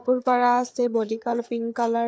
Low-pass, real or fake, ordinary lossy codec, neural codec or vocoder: none; fake; none; codec, 16 kHz, 4 kbps, FreqCodec, larger model